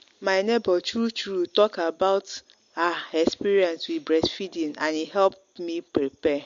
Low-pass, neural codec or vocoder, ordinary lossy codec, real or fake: 7.2 kHz; none; MP3, 48 kbps; real